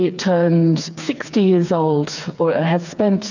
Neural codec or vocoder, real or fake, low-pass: codec, 16 kHz, 4 kbps, FreqCodec, smaller model; fake; 7.2 kHz